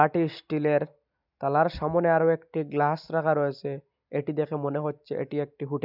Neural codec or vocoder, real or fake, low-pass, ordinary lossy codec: none; real; 5.4 kHz; none